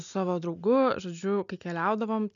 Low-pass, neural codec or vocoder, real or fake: 7.2 kHz; none; real